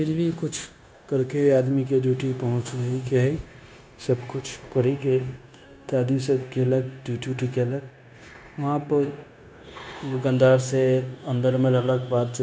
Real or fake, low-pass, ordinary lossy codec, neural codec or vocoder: fake; none; none; codec, 16 kHz, 0.9 kbps, LongCat-Audio-Codec